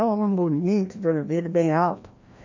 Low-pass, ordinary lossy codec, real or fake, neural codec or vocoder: 7.2 kHz; MP3, 48 kbps; fake; codec, 16 kHz, 1 kbps, FreqCodec, larger model